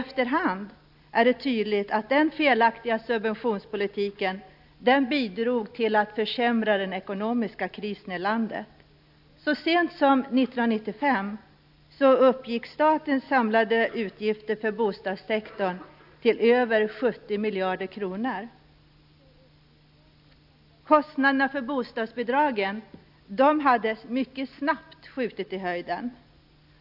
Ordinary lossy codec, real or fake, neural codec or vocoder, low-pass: AAC, 48 kbps; real; none; 5.4 kHz